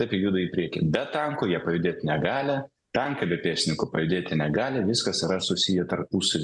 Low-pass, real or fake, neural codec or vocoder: 10.8 kHz; real; none